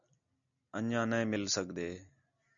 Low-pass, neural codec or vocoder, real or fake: 7.2 kHz; none; real